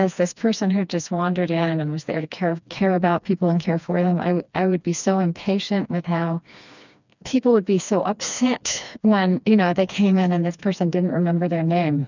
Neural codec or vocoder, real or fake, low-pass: codec, 16 kHz, 2 kbps, FreqCodec, smaller model; fake; 7.2 kHz